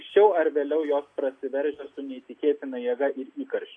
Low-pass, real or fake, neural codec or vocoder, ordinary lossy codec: 9.9 kHz; real; none; AAC, 48 kbps